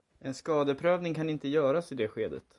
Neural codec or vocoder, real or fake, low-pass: none; real; 10.8 kHz